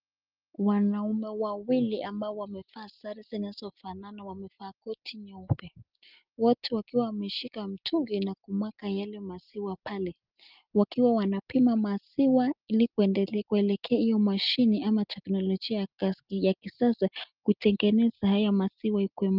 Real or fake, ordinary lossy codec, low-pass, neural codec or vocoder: real; Opus, 24 kbps; 5.4 kHz; none